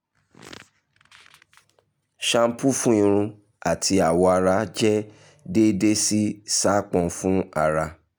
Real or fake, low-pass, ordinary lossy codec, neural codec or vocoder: real; none; none; none